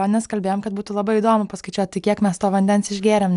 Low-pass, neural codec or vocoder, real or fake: 10.8 kHz; none; real